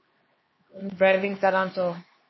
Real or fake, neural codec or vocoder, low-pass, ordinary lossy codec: fake; codec, 16 kHz, 2 kbps, X-Codec, HuBERT features, trained on LibriSpeech; 7.2 kHz; MP3, 24 kbps